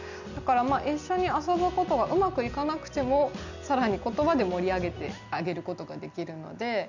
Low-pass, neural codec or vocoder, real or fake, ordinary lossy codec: 7.2 kHz; none; real; none